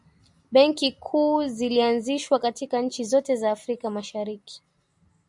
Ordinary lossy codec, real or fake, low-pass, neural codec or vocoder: MP3, 96 kbps; real; 10.8 kHz; none